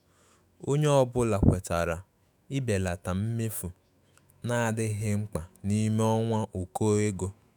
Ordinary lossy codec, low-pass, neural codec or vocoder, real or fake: none; none; autoencoder, 48 kHz, 128 numbers a frame, DAC-VAE, trained on Japanese speech; fake